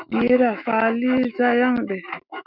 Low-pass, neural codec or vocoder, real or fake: 5.4 kHz; autoencoder, 48 kHz, 128 numbers a frame, DAC-VAE, trained on Japanese speech; fake